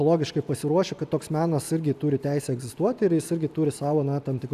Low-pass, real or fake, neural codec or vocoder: 14.4 kHz; real; none